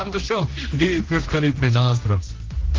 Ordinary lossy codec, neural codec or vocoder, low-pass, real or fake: Opus, 16 kbps; codec, 16 kHz, 1 kbps, X-Codec, HuBERT features, trained on general audio; 7.2 kHz; fake